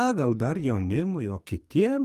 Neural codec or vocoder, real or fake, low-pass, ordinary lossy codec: codec, 44.1 kHz, 2.6 kbps, SNAC; fake; 14.4 kHz; Opus, 32 kbps